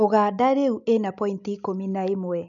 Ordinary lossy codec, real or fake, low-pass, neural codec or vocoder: none; real; 7.2 kHz; none